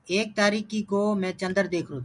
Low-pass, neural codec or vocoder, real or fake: 10.8 kHz; none; real